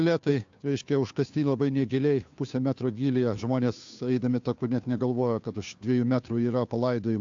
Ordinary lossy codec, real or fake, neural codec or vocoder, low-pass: AAC, 64 kbps; fake; codec, 16 kHz, 2 kbps, FunCodec, trained on Chinese and English, 25 frames a second; 7.2 kHz